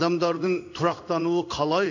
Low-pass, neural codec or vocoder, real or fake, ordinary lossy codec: 7.2 kHz; codec, 16 kHz in and 24 kHz out, 1 kbps, XY-Tokenizer; fake; none